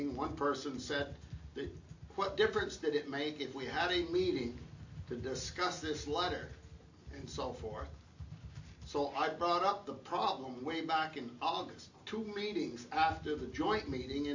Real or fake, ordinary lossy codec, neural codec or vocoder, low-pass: real; AAC, 48 kbps; none; 7.2 kHz